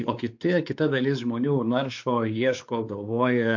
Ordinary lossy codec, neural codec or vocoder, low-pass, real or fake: AAC, 48 kbps; codec, 16 kHz, 4 kbps, X-Codec, WavLM features, trained on Multilingual LibriSpeech; 7.2 kHz; fake